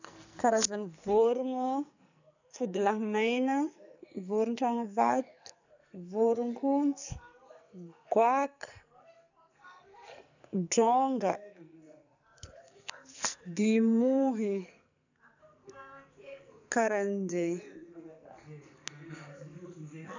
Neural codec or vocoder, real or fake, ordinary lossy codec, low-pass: codec, 44.1 kHz, 2.6 kbps, SNAC; fake; none; 7.2 kHz